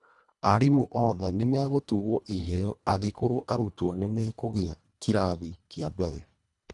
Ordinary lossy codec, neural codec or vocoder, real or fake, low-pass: none; codec, 24 kHz, 1.5 kbps, HILCodec; fake; 10.8 kHz